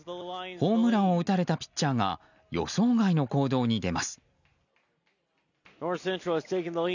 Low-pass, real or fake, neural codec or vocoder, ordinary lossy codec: 7.2 kHz; real; none; none